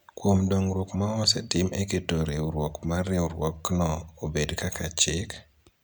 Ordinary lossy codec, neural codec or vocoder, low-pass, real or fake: none; none; none; real